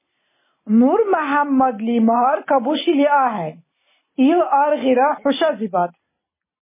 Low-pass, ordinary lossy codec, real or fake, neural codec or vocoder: 3.6 kHz; MP3, 16 kbps; real; none